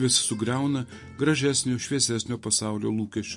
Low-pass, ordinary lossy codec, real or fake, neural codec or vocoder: 10.8 kHz; MP3, 48 kbps; real; none